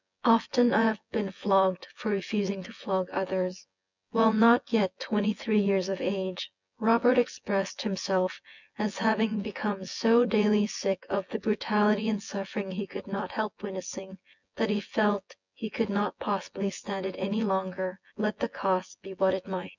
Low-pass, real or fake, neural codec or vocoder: 7.2 kHz; fake; vocoder, 24 kHz, 100 mel bands, Vocos